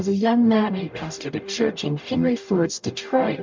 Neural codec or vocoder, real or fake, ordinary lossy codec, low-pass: codec, 44.1 kHz, 0.9 kbps, DAC; fake; MP3, 64 kbps; 7.2 kHz